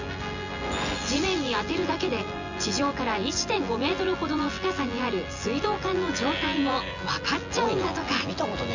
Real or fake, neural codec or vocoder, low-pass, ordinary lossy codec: fake; vocoder, 24 kHz, 100 mel bands, Vocos; 7.2 kHz; Opus, 64 kbps